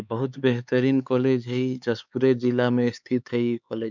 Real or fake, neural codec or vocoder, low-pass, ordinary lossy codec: fake; codec, 16 kHz, 4 kbps, X-Codec, HuBERT features, trained on LibriSpeech; 7.2 kHz; none